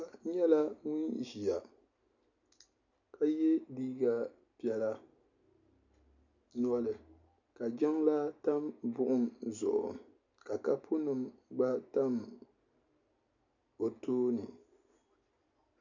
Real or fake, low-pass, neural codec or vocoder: real; 7.2 kHz; none